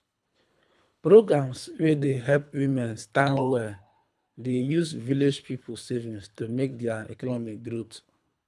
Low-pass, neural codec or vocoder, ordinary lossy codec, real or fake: none; codec, 24 kHz, 3 kbps, HILCodec; none; fake